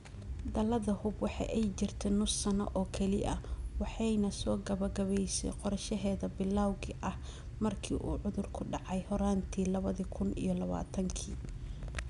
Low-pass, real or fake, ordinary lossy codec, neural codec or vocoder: 10.8 kHz; real; none; none